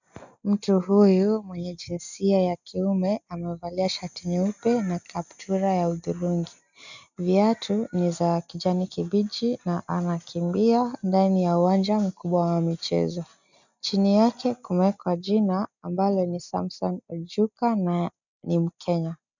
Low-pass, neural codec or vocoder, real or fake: 7.2 kHz; none; real